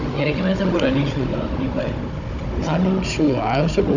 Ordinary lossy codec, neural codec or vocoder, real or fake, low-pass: none; codec, 16 kHz, 16 kbps, FunCodec, trained on Chinese and English, 50 frames a second; fake; 7.2 kHz